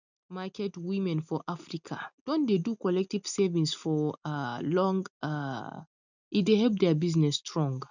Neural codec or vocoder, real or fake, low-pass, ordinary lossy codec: none; real; 7.2 kHz; none